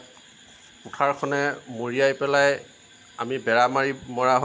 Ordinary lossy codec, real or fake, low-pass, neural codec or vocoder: none; real; none; none